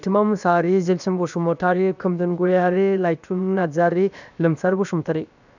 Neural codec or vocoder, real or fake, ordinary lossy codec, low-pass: codec, 16 kHz, about 1 kbps, DyCAST, with the encoder's durations; fake; none; 7.2 kHz